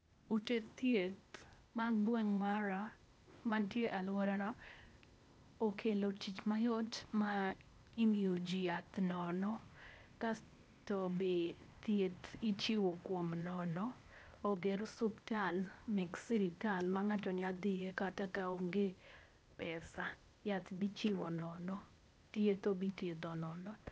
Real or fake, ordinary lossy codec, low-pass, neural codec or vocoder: fake; none; none; codec, 16 kHz, 0.8 kbps, ZipCodec